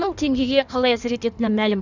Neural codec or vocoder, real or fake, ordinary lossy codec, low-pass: codec, 16 kHz in and 24 kHz out, 1.1 kbps, FireRedTTS-2 codec; fake; none; 7.2 kHz